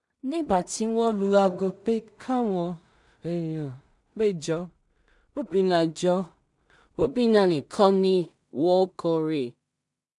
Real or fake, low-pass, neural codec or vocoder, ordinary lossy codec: fake; 10.8 kHz; codec, 16 kHz in and 24 kHz out, 0.4 kbps, LongCat-Audio-Codec, two codebook decoder; none